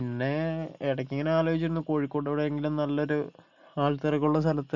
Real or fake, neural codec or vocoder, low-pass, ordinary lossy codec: real; none; 7.2 kHz; none